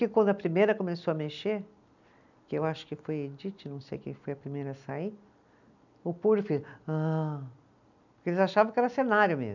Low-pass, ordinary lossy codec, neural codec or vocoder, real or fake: 7.2 kHz; none; none; real